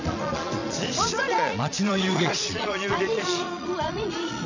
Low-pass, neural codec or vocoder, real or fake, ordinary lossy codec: 7.2 kHz; vocoder, 22.05 kHz, 80 mel bands, Vocos; fake; none